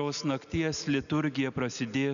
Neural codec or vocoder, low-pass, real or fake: none; 7.2 kHz; real